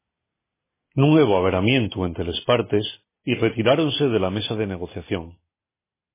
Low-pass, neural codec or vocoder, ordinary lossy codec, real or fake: 3.6 kHz; none; MP3, 16 kbps; real